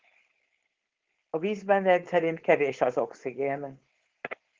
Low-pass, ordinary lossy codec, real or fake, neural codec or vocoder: 7.2 kHz; Opus, 16 kbps; fake; codec, 16 kHz, 4.8 kbps, FACodec